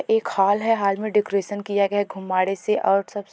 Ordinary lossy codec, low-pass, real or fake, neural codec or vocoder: none; none; real; none